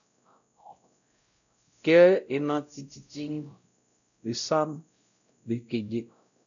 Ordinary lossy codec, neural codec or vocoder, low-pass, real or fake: MP3, 96 kbps; codec, 16 kHz, 0.5 kbps, X-Codec, WavLM features, trained on Multilingual LibriSpeech; 7.2 kHz; fake